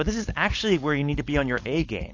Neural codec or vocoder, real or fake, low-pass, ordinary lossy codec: codec, 16 kHz, 4.8 kbps, FACodec; fake; 7.2 kHz; AAC, 48 kbps